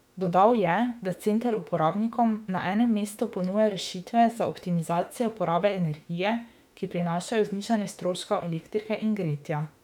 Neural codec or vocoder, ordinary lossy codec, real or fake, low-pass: autoencoder, 48 kHz, 32 numbers a frame, DAC-VAE, trained on Japanese speech; none; fake; 19.8 kHz